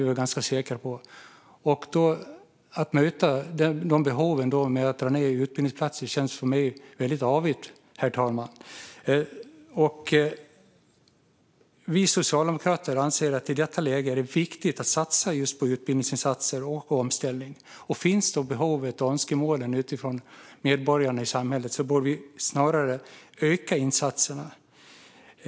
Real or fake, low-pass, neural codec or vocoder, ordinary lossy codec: real; none; none; none